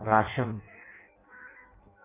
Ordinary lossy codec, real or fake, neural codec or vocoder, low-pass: MP3, 32 kbps; fake; codec, 16 kHz in and 24 kHz out, 0.6 kbps, FireRedTTS-2 codec; 3.6 kHz